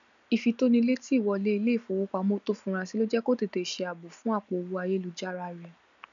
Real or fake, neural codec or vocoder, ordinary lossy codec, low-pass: real; none; none; 7.2 kHz